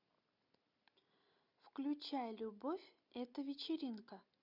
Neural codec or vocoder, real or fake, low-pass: none; real; 5.4 kHz